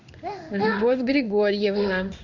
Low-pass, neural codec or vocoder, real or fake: 7.2 kHz; codec, 16 kHz in and 24 kHz out, 1 kbps, XY-Tokenizer; fake